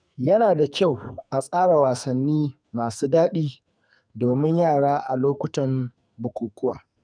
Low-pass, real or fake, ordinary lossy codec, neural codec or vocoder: 9.9 kHz; fake; none; codec, 44.1 kHz, 2.6 kbps, SNAC